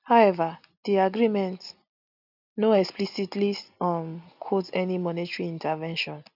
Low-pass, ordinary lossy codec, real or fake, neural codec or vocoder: 5.4 kHz; none; real; none